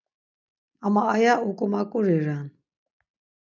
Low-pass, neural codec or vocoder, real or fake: 7.2 kHz; none; real